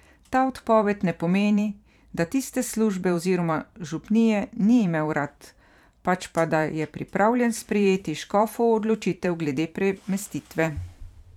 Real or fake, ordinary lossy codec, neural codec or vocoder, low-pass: fake; none; vocoder, 44.1 kHz, 128 mel bands every 512 samples, BigVGAN v2; 19.8 kHz